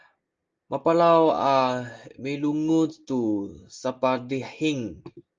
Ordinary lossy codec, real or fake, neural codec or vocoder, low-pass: Opus, 24 kbps; real; none; 7.2 kHz